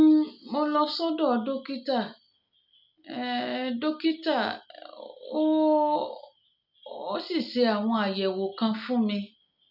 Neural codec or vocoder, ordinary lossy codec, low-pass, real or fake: none; none; 5.4 kHz; real